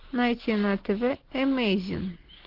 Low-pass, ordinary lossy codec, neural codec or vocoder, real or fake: 5.4 kHz; Opus, 16 kbps; none; real